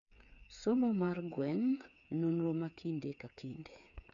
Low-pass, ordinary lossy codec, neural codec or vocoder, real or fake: 7.2 kHz; MP3, 96 kbps; codec, 16 kHz, 8 kbps, FreqCodec, smaller model; fake